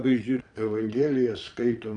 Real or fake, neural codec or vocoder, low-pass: fake; vocoder, 22.05 kHz, 80 mel bands, Vocos; 9.9 kHz